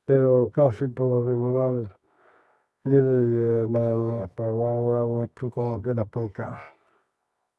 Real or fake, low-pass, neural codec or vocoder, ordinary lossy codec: fake; none; codec, 24 kHz, 0.9 kbps, WavTokenizer, medium music audio release; none